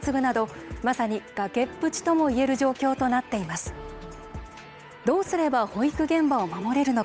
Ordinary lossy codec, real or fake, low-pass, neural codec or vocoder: none; fake; none; codec, 16 kHz, 8 kbps, FunCodec, trained on Chinese and English, 25 frames a second